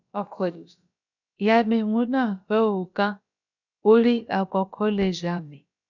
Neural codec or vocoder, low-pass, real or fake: codec, 16 kHz, about 1 kbps, DyCAST, with the encoder's durations; 7.2 kHz; fake